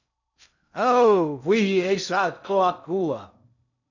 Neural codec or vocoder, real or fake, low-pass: codec, 16 kHz in and 24 kHz out, 0.6 kbps, FocalCodec, streaming, 2048 codes; fake; 7.2 kHz